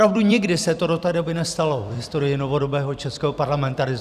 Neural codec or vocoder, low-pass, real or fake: vocoder, 44.1 kHz, 128 mel bands every 256 samples, BigVGAN v2; 14.4 kHz; fake